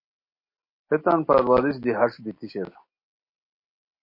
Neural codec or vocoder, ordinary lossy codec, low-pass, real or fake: none; MP3, 32 kbps; 5.4 kHz; real